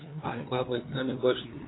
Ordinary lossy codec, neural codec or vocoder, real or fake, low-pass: AAC, 16 kbps; codec, 16 kHz, 2 kbps, FunCodec, trained on LibriTTS, 25 frames a second; fake; 7.2 kHz